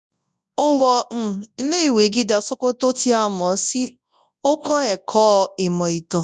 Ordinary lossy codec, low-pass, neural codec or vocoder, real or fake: none; 10.8 kHz; codec, 24 kHz, 0.9 kbps, WavTokenizer, large speech release; fake